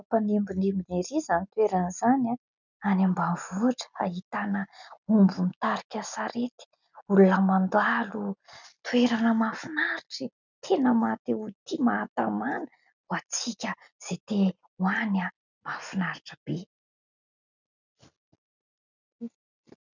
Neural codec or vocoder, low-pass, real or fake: none; 7.2 kHz; real